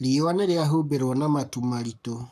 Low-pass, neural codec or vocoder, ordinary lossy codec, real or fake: 14.4 kHz; codec, 44.1 kHz, 7.8 kbps, Pupu-Codec; none; fake